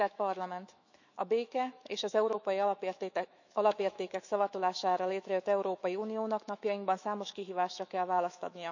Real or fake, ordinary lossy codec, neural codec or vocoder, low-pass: fake; none; autoencoder, 48 kHz, 128 numbers a frame, DAC-VAE, trained on Japanese speech; 7.2 kHz